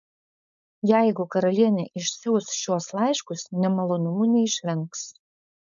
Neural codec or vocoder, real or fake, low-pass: codec, 16 kHz, 4.8 kbps, FACodec; fake; 7.2 kHz